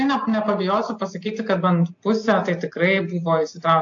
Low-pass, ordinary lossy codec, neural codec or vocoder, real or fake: 7.2 kHz; AAC, 48 kbps; none; real